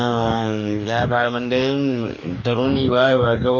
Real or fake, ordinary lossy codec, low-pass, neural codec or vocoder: fake; none; 7.2 kHz; codec, 44.1 kHz, 2.6 kbps, DAC